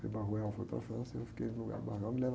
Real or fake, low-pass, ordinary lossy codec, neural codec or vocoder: real; none; none; none